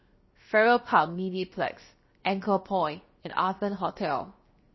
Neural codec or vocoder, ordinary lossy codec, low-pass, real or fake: codec, 16 kHz, 0.7 kbps, FocalCodec; MP3, 24 kbps; 7.2 kHz; fake